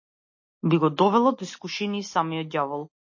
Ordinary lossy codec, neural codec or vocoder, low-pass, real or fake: MP3, 32 kbps; none; 7.2 kHz; real